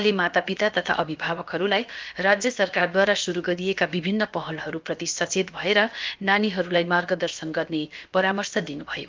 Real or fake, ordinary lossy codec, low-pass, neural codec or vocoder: fake; Opus, 24 kbps; 7.2 kHz; codec, 16 kHz, about 1 kbps, DyCAST, with the encoder's durations